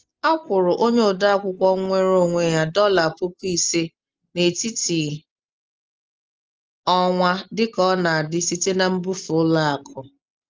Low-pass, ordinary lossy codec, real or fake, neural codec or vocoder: 7.2 kHz; Opus, 24 kbps; real; none